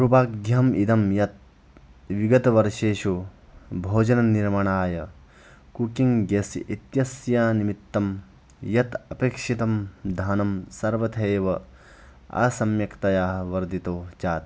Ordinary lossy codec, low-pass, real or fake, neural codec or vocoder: none; none; real; none